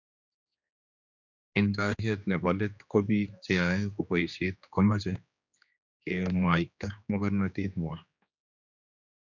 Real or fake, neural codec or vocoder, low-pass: fake; codec, 16 kHz, 2 kbps, X-Codec, HuBERT features, trained on general audio; 7.2 kHz